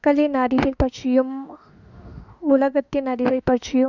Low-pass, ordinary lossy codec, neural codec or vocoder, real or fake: 7.2 kHz; none; autoencoder, 48 kHz, 32 numbers a frame, DAC-VAE, trained on Japanese speech; fake